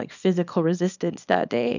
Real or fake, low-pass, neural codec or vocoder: fake; 7.2 kHz; codec, 24 kHz, 0.9 kbps, WavTokenizer, small release